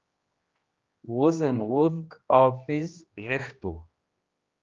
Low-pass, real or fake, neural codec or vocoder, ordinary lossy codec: 7.2 kHz; fake; codec, 16 kHz, 1 kbps, X-Codec, HuBERT features, trained on general audio; Opus, 32 kbps